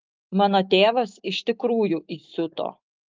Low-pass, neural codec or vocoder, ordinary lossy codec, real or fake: 7.2 kHz; autoencoder, 48 kHz, 128 numbers a frame, DAC-VAE, trained on Japanese speech; Opus, 24 kbps; fake